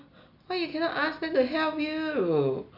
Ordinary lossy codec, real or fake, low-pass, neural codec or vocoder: none; real; 5.4 kHz; none